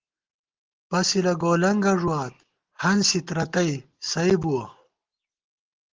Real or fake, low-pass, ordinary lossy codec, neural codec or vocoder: real; 7.2 kHz; Opus, 16 kbps; none